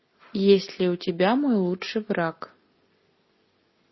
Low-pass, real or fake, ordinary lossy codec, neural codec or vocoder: 7.2 kHz; real; MP3, 24 kbps; none